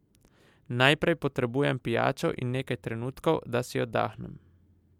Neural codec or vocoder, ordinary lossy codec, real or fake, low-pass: none; MP3, 96 kbps; real; 19.8 kHz